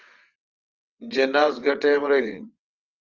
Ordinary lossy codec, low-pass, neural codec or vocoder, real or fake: Opus, 32 kbps; 7.2 kHz; vocoder, 22.05 kHz, 80 mel bands, WaveNeXt; fake